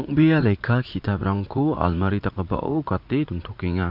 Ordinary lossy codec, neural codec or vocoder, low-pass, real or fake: MP3, 48 kbps; vocoder, 44.1 kHz, 80 mel bands, Vocos; 5.4 kHz; fake